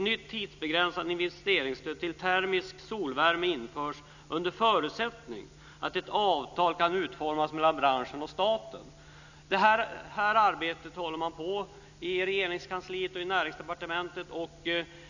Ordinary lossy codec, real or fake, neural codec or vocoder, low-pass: none; real; none; 7.2 kHz